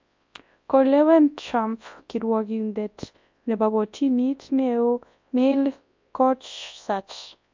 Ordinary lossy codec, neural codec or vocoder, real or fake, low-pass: MP3, 48 kbps; codec, 24 kHz, 0.9 kbps, WavTokenizer, large speech release; fake; 7.2 kHz